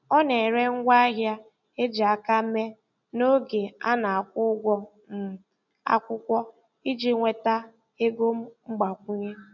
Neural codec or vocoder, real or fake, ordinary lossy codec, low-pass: none; real; none; 7.2 kHz